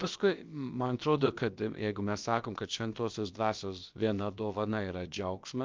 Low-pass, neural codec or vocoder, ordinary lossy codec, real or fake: 7.2 kHz; codec, 16 kHz, about 1 kbps, DyCAST, with the encoder's durations; Opus, 24 kbps; fake